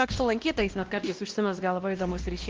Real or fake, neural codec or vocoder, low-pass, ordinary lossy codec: fake; codec, 16 kHz, 1 kbps, X-Codec, WavLM features, trained on Multilingual LibriSpeech; 7.2 kHz; Opus, 16 kbps